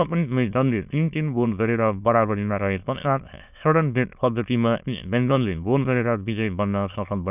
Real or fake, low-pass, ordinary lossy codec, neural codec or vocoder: fake; 3.6 kHz; none; autoencoder, 22.05 kHz, a latent of 192 numbers a frame, VITS, trained on many speakers